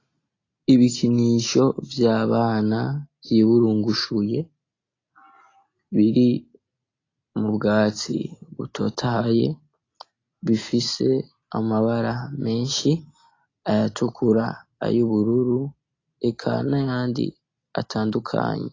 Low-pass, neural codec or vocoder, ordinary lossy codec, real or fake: 7.2 kHz; none; AAC, 32 kbps; real